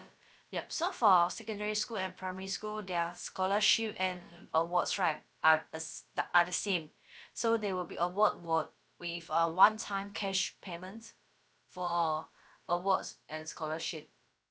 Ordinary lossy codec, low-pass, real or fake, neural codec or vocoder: none; none; fake; codec, 16 kHz, about 1 kbps, DyCAST, with the encoder's durations